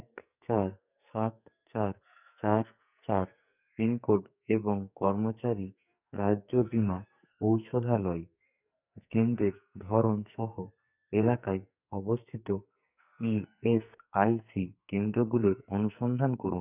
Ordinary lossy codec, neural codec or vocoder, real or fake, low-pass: none; codec, 44.1 kHz, 2.6 kbps, SNAC; fake; 3.6 kHz